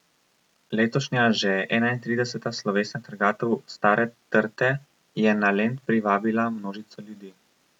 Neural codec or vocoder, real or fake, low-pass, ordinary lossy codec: none; real; 19.8 kHz; none